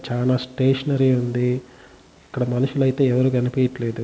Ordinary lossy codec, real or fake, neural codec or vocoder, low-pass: none; real; none; none